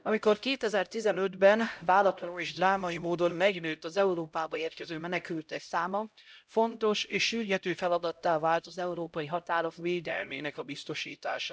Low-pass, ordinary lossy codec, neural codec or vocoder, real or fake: none; none; codec, 16 kHz, 0.5 kbps, X-Codec, HuBERT features, trained on LibriSpeech; fake